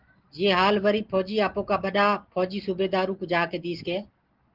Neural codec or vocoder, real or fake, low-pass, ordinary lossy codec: none; real; 5.4 kHz; Opus, 16 kbps